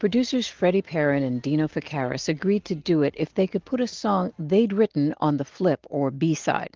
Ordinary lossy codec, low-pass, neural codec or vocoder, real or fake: Opus, 16 kbps; 7.2 kHz; none; real